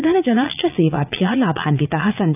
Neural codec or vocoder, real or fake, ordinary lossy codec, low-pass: vocoder, 44.1 kHz, 80 mel bands, Vocos; fake; none; 3.6 kHz